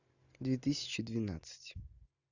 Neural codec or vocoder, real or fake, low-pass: none; real; 7.2 kHz